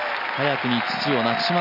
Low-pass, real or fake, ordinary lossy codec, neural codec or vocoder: 5.4 kHz; real; none; none